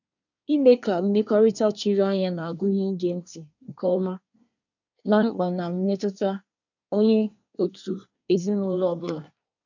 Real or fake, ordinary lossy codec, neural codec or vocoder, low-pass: fake; none; codec, 24 kHz, 1 kbps, SNAC; 7.2 kHz